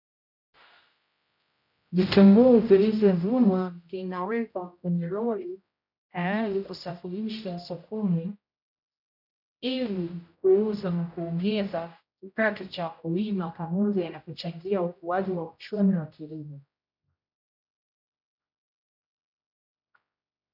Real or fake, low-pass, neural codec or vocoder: fake; 5.4 kHz; codec, 16 kHz, 0.5 kbps, X-Codec, HuBERT features, trained on general audio